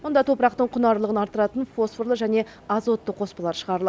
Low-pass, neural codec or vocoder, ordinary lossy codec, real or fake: none; none; none; real